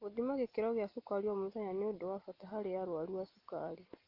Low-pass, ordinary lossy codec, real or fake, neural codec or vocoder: 5.4 kHz; Opus, 24 kbps; real; none